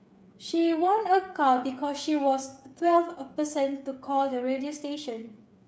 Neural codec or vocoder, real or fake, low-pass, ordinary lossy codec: codec, 16 kHz, 8 kbps, FreqCodec, smaller model; fake; none; none